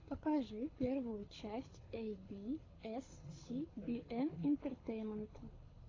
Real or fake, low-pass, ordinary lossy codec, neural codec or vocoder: fake; 7.2 kHz; AAC, 32 kbps; codec, 24 kHz, 6 kbps, HILCodec